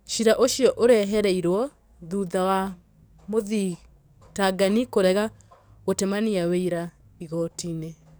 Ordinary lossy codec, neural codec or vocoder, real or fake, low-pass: none; codec, 44.1 kHz, 7.8 kbps, DAC; fake; none